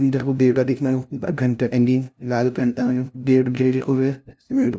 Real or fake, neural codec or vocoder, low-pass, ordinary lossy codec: fake; codec, 16 kHz, 0.5 kbps, FunCodec, trained on LibriTTS, 25 frames a second; none; none